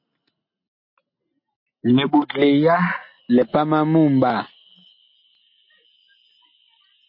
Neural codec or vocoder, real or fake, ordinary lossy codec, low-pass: none; real; MP3, 32 kbps; 5.4 kHz